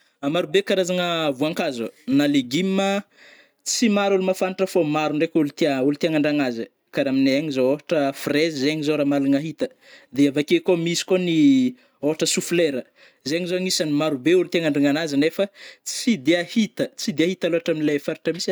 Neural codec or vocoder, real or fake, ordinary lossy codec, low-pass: none; real; none; none